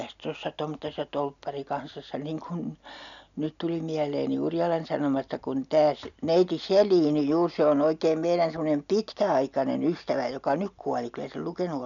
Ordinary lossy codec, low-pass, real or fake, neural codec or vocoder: none; 7.2 kHz; real; none